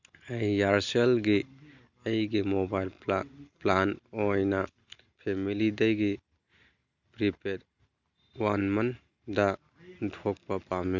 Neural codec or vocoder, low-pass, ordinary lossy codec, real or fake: none; 7.2 kHz; none; real